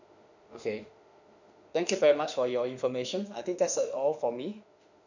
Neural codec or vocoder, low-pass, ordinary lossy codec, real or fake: autoencoder, 48 kHz, 32 numbers a frame, DAC-VAE, trained on Japanese speech; 7.2 kHz; none; fake